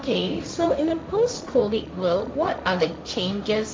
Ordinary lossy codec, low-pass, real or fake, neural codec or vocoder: none; none; fake; codec, 16 kHz, 1.1 kbps, Voila-Tokenizer